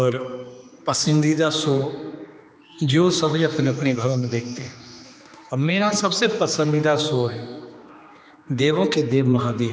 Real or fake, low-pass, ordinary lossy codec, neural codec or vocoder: fake; none; none; codec, 16 kHz, 2 kbps, X-Codec, HuBERT features, trained on general audio